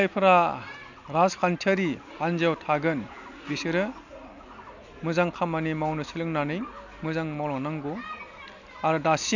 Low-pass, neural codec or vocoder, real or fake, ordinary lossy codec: 7.2 kHz; none; real; none